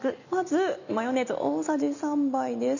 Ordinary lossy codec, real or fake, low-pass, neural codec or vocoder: none; real; 7.2 kHz; none